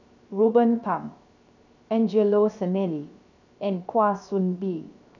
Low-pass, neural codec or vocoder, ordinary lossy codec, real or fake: 7.2 kHz; codec, 16 kHz, 0.7 kbps, FocalCodec; none; fake